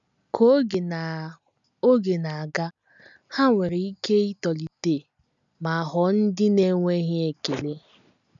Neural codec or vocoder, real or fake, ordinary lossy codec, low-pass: none; real; none; 7.2 kHz